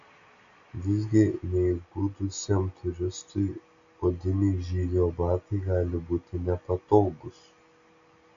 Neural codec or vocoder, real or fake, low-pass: none; real; 7.2 kHz